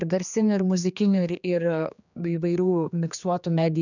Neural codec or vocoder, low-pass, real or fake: codec, 16 kHz, 2 kbps, X-Codec, HuBERT features, trained on general audio; 7.2 kHz; fake